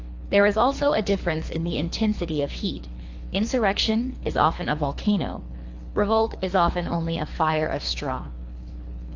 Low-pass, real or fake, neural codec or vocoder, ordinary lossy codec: 7.2 kHz; fake; codec, 24 kHz, 3 kbps, HILCodec; AAC, 48 kbps